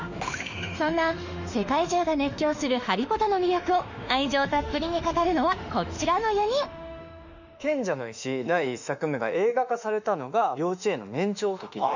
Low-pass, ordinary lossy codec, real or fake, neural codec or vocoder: 7.2 kHz; none; fake; autoencoder, 48 kHz, 32 numbers a frame, DAC-VAE, trained on Japanese speech